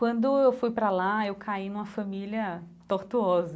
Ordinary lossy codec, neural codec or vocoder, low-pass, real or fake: none; none; none; real